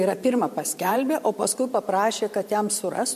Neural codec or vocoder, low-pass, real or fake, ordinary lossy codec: vocoder, 44.1 kHz, 128 mel bands every 512 samples, BigVGAN v2; 14.4 kHz; fake; MP3, 64 kbps